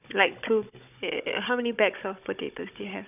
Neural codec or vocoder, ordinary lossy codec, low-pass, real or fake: codec, 16 kHz, 16 kbps, FunCodec, trained on Chinese and English, 50 frames a second; none; 3.6 kHz; fake